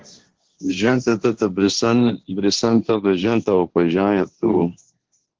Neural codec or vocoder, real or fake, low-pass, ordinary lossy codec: codec, 16 kHz, 1.1 kbps, Voila-Tokenizer; fake; 7.2 kHz; Opus, 16 kbps